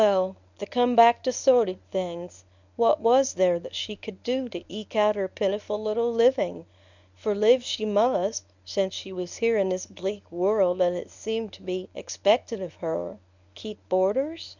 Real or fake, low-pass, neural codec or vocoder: fake; 7.2 kHz; codec, 24 kHz, 0.9 kbps, WavTokenizer, medium speech release version 1